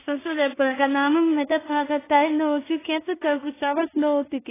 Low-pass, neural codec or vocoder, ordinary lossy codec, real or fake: 3.6 kHz; codec, 16 kHz in and 24 kHz out, 0.4 kbps, LongCat-Audio-Codec, two codebook decoder; AAC, 16 kbps; fake